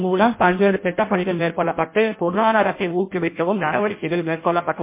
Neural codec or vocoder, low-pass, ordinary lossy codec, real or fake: codec, 16 kHz in and 24 kHz out, 0.6 kbps, FireRedTTS-2 codec; 3.6 kHz; MP3, 24 kbps; fake